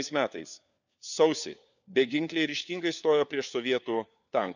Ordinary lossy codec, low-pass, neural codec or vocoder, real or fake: none; 7.2 kHz; codec, 16 kHz, 4 kbps, FunCodec, trained on LibriTTS, 50 frames a second; fake